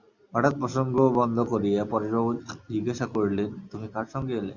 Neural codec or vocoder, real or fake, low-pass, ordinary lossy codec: none; real; 7.2 kHz; Opus, 64 kbps